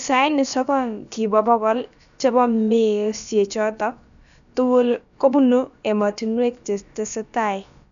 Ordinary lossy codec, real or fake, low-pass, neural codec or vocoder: none; fake; 7.2 kHz; codec, 16 kHz, about 1 kbps, DyCAST, with the encoder's durations